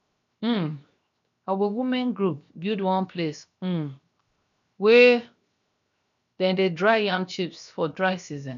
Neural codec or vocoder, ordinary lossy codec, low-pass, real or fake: codec, 16 kHz, 0.7 kbps, FocalCodec; none; 7.2 kHz; fake